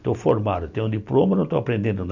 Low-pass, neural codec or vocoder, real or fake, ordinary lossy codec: 7.2 kHz; none; real; none